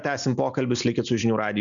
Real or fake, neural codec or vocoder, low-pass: real; none; 7.2 kHz